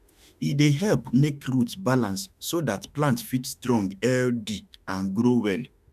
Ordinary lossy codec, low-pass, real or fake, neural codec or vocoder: none; 14.4 kHz; fake; autoencoder, 48 kHz, 32 numbers a frame, DAC-VAE, trained on Japanese speech